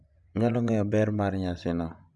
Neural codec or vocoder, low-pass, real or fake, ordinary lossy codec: vocoder, 24 kHz, 100 mel bands, Vocos; 10.8 kHz; fake; none